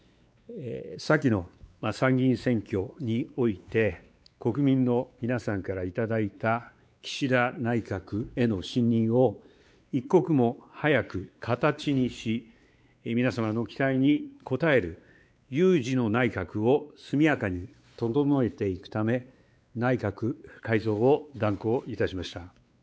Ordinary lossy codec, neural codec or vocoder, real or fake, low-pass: none; codec, 16 kHz, 4 kbps, X-Codec, WavLM features, trained on Multilingual LibriSpeech; fake; none